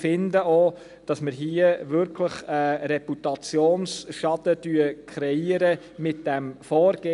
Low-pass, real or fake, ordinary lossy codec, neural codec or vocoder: 10.8 kHz; real; none; none